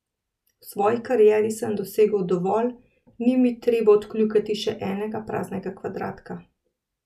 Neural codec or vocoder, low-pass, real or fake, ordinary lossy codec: none; 14.4 kHz; real; none